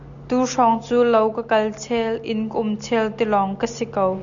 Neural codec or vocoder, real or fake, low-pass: none; real; 7.2 kHz